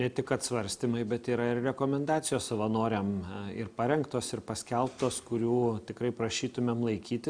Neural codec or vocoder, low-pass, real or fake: none; 9.9 kHz; real